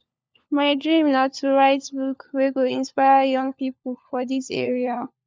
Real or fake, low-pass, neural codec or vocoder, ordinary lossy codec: fake; none; codec, 16 kHz, 4 kbps, FunCodec, trained on LibriTTS, 50 frames a second; none